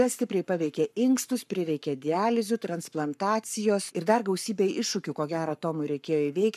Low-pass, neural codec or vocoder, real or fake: 14.4 kHz; codec, 44.1 kHz, 7.8 kbps, Pupu-Codec; fake